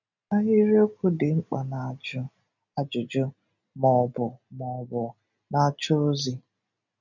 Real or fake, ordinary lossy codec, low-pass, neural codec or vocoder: real; none; 7.2 kHz; none